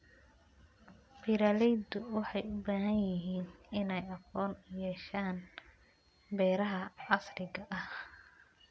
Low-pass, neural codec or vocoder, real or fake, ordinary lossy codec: none; none; real; none